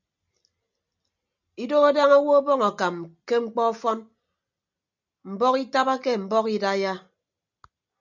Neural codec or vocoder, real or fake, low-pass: none; real; 7.2 kHz